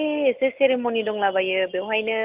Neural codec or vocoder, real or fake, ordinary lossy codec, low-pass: none; real; Opus, 24 kbps; 3.6 kHz